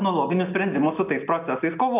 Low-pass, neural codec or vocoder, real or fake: 3.6 kHz; none; real